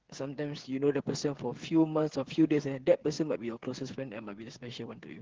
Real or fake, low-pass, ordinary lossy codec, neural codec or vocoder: fake; 7.2 kHz; Opus, 16 kbps; codec, 16 kHz, 8 kbps, FreqCodec, smaller model